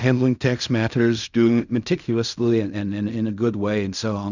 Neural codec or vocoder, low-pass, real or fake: codec, 16 kHz in and 24 kHz out, 0.4 kbps, LongCat-Audio-Codec, fine tuned four codebook decoder; 7.2 kHz; fake